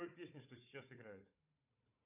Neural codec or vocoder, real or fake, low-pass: codec, 16 kHz, 4 kbps, FunCodec, trained on Chinese and English, 50 frames a second; fake; 3.6 kHz